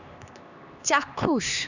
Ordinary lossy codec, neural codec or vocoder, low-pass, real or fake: none; codec, 16 kHz, 2 kbps, X-Codec, HuBERT features, trained on LibriSpeech; 7.2 kHz; fake